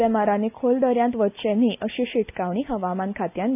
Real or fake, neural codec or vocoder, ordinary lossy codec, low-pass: real; none; none; 3.6 kHz